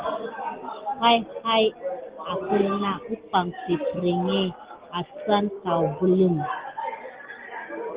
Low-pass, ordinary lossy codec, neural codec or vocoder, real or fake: 3.6 kHz; Opus, 16 kbps; none; real